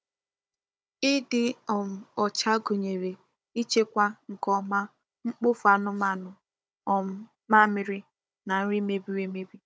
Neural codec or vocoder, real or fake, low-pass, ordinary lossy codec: codec, 16 kHz, 16 kbps, FunCodec, trained on Chinese and English, 50 frames a second; fake; none; none